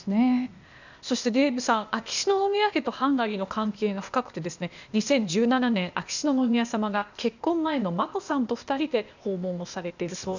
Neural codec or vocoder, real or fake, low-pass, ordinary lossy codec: codec, 16 kHz, 0.8 kbps, ZipCodec; fake; 7.2 kHz; none